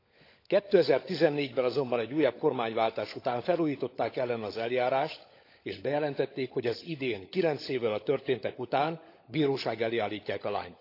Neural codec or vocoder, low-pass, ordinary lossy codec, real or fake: codec, 16 kHz, 16 kbps, FunCodec, trained on LibriTTS, 50 frames a second; 5.4 kHz; AAC, 32 kbps; fake